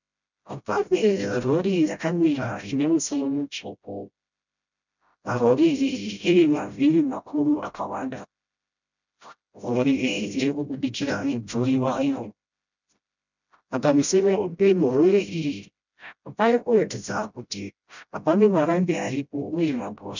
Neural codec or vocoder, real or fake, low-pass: codec, 16 kHz, 0.5 kbps, FreqCodec, smaller model; fake; 7.2 kHz